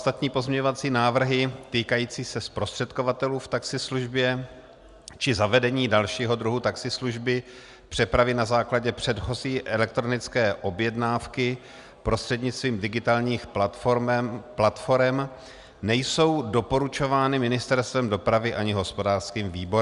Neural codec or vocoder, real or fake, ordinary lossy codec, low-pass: none; real; Opus, 64 kbps; 10.8 kHz